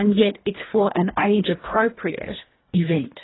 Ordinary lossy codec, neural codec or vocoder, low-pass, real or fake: AAC, 16 kbps; codec, 24 kHz, 1.5 kbps, HILCodec; 7.2 kHz; fake